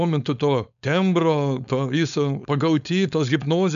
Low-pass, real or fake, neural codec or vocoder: 7.2 kHz; fake; codec, 16 kHz, 4.8 kbps, FACodec